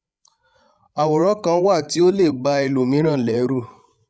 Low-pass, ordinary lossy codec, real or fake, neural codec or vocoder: none; none; fake; codec, 16 kHz, 16 kbps, FreqCodec, larger model